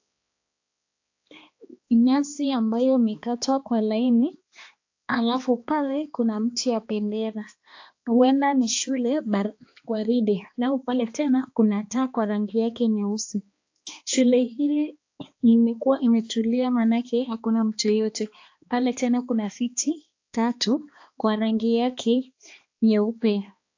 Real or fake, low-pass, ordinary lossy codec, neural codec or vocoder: fake; 7.2 kHz; AAC, 48 kbps; codec, 16 kHz, 2 kbps, X-Codec, HuBERT features, trained on balanced general audio